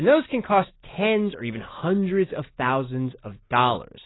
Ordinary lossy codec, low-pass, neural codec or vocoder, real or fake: AAC, 16 kbps; 7.2 kHz; none; real